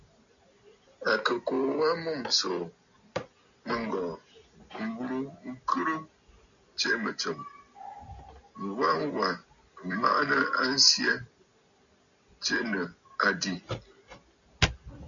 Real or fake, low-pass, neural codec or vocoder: real; 7.2 kHz; none